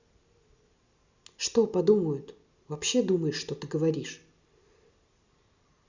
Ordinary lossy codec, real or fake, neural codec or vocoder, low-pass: Opus, 64 kbps; real; none; 7.2 kHz